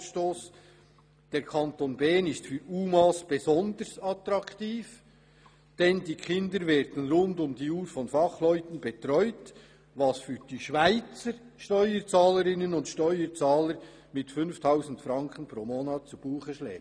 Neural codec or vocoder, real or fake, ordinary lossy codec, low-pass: none; real; none; 9.9 kHz